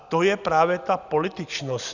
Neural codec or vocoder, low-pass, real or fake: none; 7.2 kHz; real